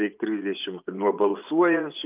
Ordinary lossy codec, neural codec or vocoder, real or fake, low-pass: Opus, 24 kbps; vocoder, 24 kHz, 100 mel bands, Vocos; fake; 3.6 kHz